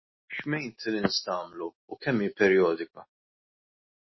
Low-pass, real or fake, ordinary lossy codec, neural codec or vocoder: 7.2 kHz; real; MP3, 24 kbps; none